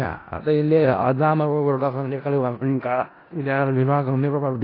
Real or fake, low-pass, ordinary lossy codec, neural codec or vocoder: fake; 5.4 kHz; AAC, 24 kbps; codec, 16 kHz in and 24 kHz out, 0.4 kbps, LongCat-Audio-Codec, four codebook decoder